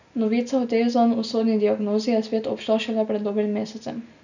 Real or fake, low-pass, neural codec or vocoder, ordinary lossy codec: real; 7.2 kHz; none; none